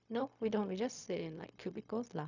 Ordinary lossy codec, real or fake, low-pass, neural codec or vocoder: none; fake; 7.2 kHz; codec, 16 kHz, 0.4 kbps, LongCat-Audio-Codec